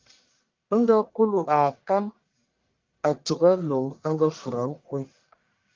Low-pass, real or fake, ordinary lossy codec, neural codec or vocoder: 7.2 kHz; fake; Opus, 24 kbps; codec, 44.1 kHz, 1.7 kbps, Pupu-Codec